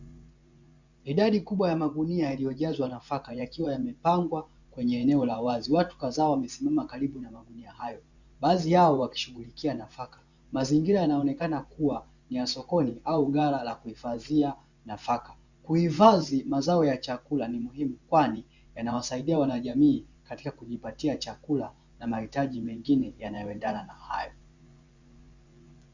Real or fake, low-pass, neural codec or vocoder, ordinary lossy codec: fake; 7.2 kHz; vocoder, 24 kHz, 100 mel bands, Vocos; Opus, 64 kbps